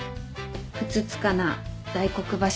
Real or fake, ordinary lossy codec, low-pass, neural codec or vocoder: real; none; none; none